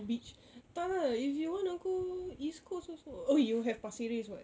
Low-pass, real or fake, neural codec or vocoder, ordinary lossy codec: none; real; none; none